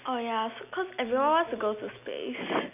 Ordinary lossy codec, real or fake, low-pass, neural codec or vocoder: none; real; 3.6 kHz; none